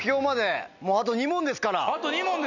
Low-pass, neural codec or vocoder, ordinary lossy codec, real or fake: 7.2 kHz; none; none; real